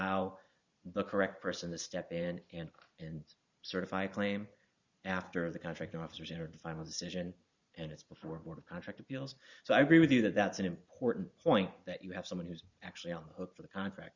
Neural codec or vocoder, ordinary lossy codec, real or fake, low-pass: vocoder, 44.1 kHz, 128 mel bands every 512 samples, BigVGAN v2; Opus, 64 kbps; fake; 7.2 kHz